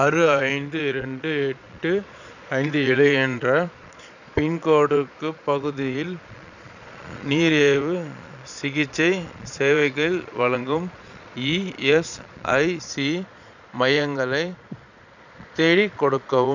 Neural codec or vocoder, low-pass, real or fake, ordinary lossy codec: vocoder, 22.05 kHz, 80 mel bands, Vocos; 7.2 kHz; fake; none